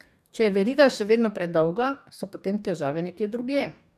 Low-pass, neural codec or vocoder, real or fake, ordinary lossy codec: 14.4 kHz; codec, 44.1 kHz, 2.6 kbps, DAC; fake; none